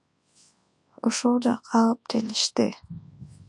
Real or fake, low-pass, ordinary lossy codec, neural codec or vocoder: fake; 10.8 kHz; AAC, 64 kbps; codec, 24 kHz, 0.9 kbps, WavTokenizer, large speech release